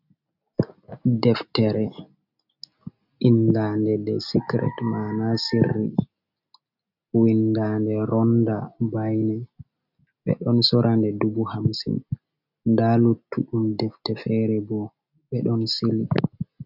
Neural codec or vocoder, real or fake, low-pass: none; real; 5.4 kHz